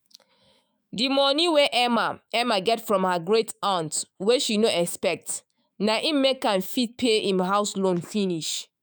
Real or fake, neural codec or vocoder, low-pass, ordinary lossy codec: fake; autoencoder, 48 kHz, 128 numbers a frame, DAC-VAE, trained on Japanese speech; none; none